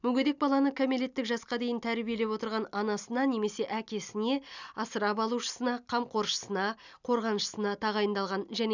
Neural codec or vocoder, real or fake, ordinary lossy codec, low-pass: none; real; none; 7.2 kHz